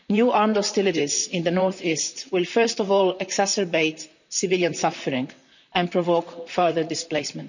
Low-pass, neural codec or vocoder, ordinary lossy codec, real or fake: 7.2 kHz; vocoder, 44.1 kHz, 128 mel bands, Pupu-Vocoder; none; fake